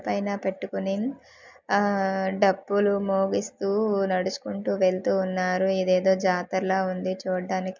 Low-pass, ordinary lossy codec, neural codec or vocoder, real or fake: 7.2 kHz; MP3, 64 kbps; none; real